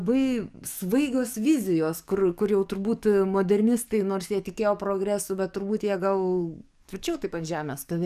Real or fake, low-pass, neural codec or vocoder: fake; 14.4 kHz; codec, 44.1 kHz, 7.8 kbps, DAC